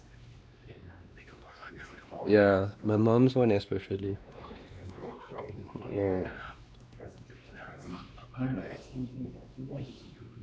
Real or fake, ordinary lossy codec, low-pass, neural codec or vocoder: fake; none; none; codec, 16 kHz, 2 kbps, X-Codec, WavLM features, trained on Multilingual LibriSpeech